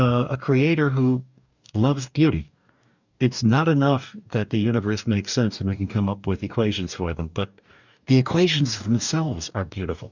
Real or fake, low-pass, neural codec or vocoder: fake; 7.2 kHz; codec, 44.1 kHz, 2.6 kbps, DAC